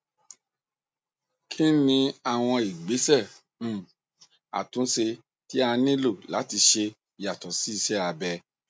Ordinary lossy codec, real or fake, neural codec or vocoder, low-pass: none; real; none; none